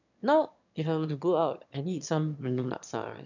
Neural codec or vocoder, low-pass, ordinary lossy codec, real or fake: autoencoder, 22.05 kHz, a latent of 192 numbers a frame, VITS, trained on one speaker; 7.2 kHz; none; fake